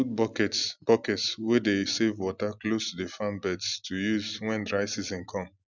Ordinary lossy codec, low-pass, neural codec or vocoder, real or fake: none; 7.2 kHz; none; real